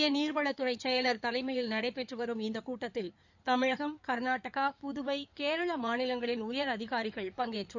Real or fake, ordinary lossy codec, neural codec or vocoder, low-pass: fake; none; codec, 16 kHz in and 24 kHz out, 2.2 kbps, FireRedTTS-2 codec; 7.2 kHz